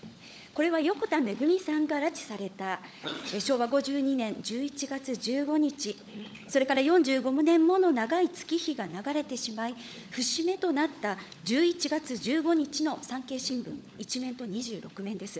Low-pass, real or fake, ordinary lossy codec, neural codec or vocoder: none; fake; none; codec, 16 kHz, 16 kbps, FunCodec, trained on LibriTTS, 50 frames a second